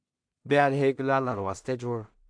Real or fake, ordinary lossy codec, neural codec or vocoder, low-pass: fake; AAC, 64 kbps; codec, 16 kHz in and 24 kHz out, 0.4 kbps, LongCat-Audio-Codec, two codebook decoder; 9.9 kHz